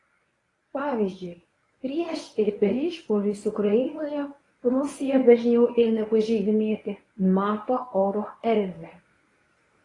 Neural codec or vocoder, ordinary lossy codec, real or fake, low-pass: codec, 24 kHz, 0.9 kbps, WavTokenizer, medium speech release version 1; AAC, 32 kbps; fake; 10.8 kHz